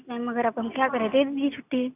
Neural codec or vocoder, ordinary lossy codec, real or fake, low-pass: none; none; real; 3.6 kHz